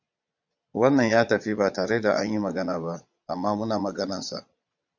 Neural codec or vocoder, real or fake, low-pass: vocoder, 22.05 kHz, 80 mel bands, Vocos; fake; 7.2 kHz